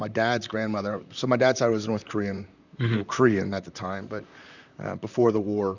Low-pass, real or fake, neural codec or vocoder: 7.2 kHz; fake; vocoder, 44.1 kHz, 128 mel bands, Pupu-Vocoder